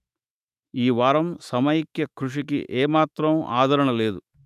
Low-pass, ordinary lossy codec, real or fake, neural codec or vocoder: 14.4 kHz; none; fake; autoencoder, 48 kHz, 128 numbers a frame, DAC-VAE, trained on Japanese speech